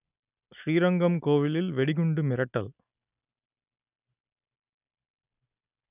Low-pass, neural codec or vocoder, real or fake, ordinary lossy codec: 3.6 kHz; none; real; none